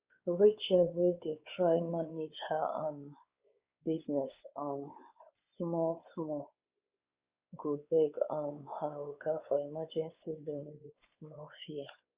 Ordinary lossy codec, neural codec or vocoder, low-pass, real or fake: Opus, 64 kbps; codec, 16 kHz, 4 kbps, X-Codec, WavLM features, trained on Multilingual LibriSpeech; 3.6 kHz; fake